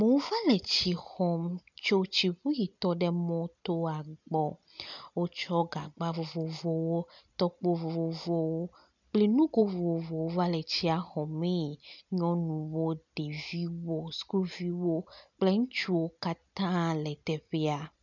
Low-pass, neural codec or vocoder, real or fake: 7.2 kHz; none; real